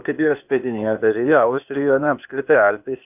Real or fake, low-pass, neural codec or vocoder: fake; 3.6 kHz; codec, 16 kHz, 0.8 kbps, ZipCodec